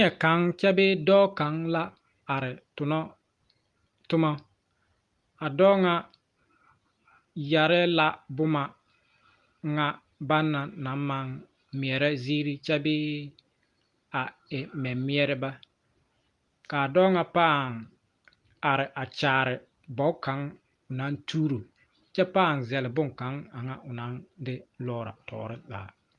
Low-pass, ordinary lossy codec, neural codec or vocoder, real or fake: 10.8 kHz; Opus, 32 kbps; none; real